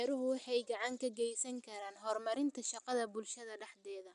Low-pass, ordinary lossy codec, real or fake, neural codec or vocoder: 10.8 kHz; none; real; none